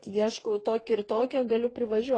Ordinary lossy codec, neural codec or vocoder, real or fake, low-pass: AAC, 32 kbps; codec, 44.1 kHz, 2.6 kbps, DAC; fake; 9.9 kHz